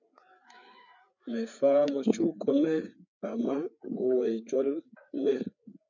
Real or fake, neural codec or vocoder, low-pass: fake; codec, 16 kHz, 4 kbps, FreqCodec, larger model; 7.2 kHz